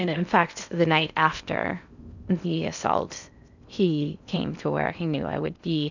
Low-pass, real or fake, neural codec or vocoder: 7.2 kHz; fake; codec, 16 kHz in and 24 kHz out, 0.6 kbps, FocalCodec, streaming, 4096 codes